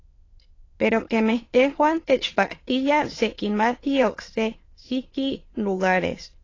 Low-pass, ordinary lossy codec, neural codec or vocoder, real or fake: 7.2 kHz; AAC, 32 kbps; autoencoder, 22.05 kHz, a latent of 192 numbers a frame, VITS, trained on many speakers; fake